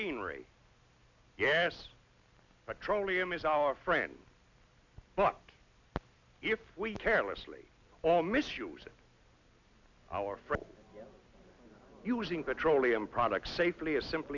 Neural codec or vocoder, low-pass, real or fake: none; 7.2 kHz; real